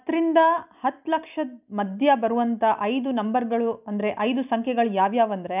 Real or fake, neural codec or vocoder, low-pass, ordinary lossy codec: real; none; 3.6 kHz; none